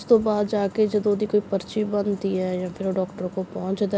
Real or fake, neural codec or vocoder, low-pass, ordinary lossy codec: real; none; none; none